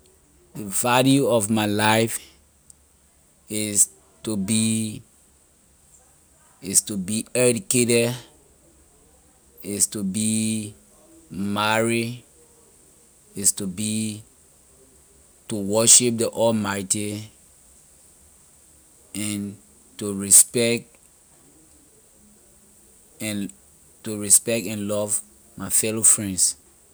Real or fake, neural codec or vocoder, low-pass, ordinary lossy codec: real; none; none; none